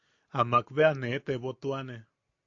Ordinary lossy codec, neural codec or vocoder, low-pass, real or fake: AAC, 48 kbps; none; 7.2 kHz; real